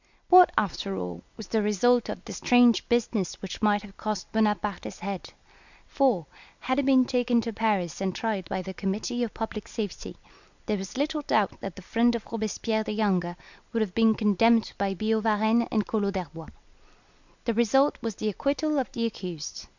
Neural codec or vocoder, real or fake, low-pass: none; real; 7.2 kHz